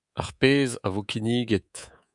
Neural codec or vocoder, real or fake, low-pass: codec, 24 kHz, 3.1 kbps, DualCodec; fake; 10.8 kHz